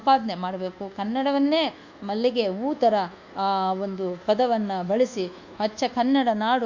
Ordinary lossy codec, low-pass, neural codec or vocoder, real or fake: Opus, 64 kbps; 7.2 kHz; codec, 24 kHz, 1.2 kbps, DualCodec; fake